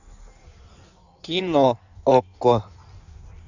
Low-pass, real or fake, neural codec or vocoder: 7.2 kHz; fake; codec, 16 kHz in and 24 kHz out, 1.1 kbps, FireRedTTS-2 codec